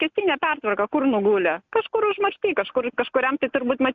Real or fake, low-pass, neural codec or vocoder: real; 7.2 kHz; none